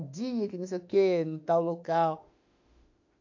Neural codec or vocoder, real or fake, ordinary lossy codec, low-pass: autoencoder, 48 kHz, 32 numbers a frame, DAC-VAE, trained on Japanese speech; fake; AAC, 48 kbps; 7.2 kHz